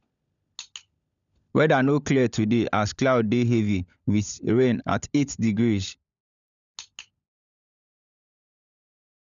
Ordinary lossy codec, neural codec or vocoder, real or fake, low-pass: none; codec, 16 kHz, 16 kbps, FunCodec, trained on LibriTTS, 50 frames a second; fake; 7.2 kHz